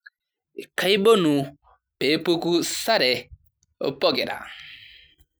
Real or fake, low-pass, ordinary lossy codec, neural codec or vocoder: real; none; none; none